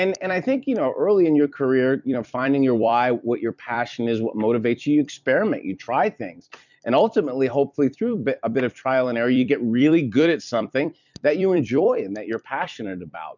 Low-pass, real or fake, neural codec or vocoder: 7.2 kHz; fake; vocoder, 44.1 kHz, 128 mel bands every 256 samples, BigVGAN v2